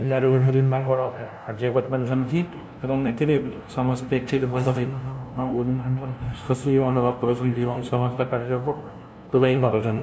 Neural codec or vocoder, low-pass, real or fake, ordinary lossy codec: codec, 16 kHz, 0.5 kbps, FunCodec, trained on LibriTTS, 25 frames a second; none; fake; none